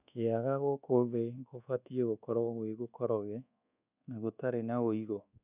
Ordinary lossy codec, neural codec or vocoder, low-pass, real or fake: none; codec, 24 kHz, 1.2 kbps, DualCodec; 3.6 kHz; fake